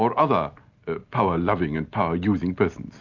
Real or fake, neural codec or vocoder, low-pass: real; none; 7.2 kHz